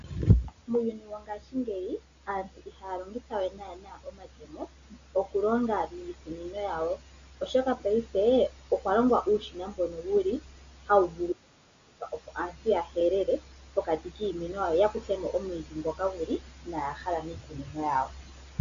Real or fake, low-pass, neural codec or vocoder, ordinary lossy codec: real; 7.2 kHz; none; MP3, 64 kbps